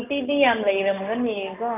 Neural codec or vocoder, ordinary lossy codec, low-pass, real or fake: none; none; 3.6 kHz; real